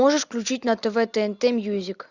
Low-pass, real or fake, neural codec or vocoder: 7.2 kHz; real; none